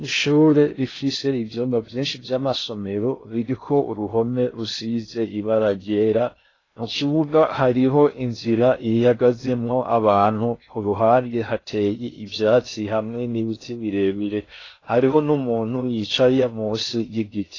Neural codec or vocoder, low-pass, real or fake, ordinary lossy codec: codec, 16 kHz in and 24 kHz out, 0.6 kbps, FocalCodec, streaming, 2048 codes; 7.2 kHz; fake; AAC, 32 kbps